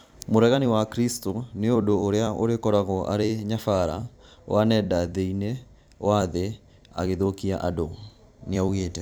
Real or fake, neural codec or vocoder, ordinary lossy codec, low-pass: fake; vocoder, 44.1 kHz, 128 mel bands every 256 samples, BigVGAN v2; none; none